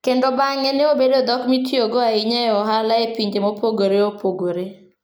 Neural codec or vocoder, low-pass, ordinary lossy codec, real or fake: none; none; none; real